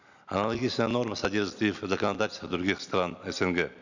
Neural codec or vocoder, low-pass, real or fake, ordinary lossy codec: none; 7.2 kHz; real; none